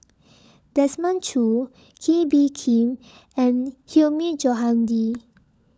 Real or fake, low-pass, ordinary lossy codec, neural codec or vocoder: fake; none; none; codec, 16 kHz, 16 kbps, FunCodec, trained on LibriTTS, 50 frames a second